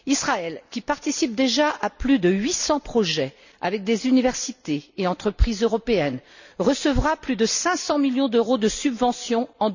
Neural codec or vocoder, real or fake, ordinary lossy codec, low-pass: none; real; none; 7.2 kHz